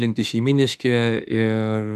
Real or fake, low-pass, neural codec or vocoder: fake; 14.4 kHz; autoencoder, 48 kHz, 32 numbers a frame, DAC-VAE, trained on Japanese speech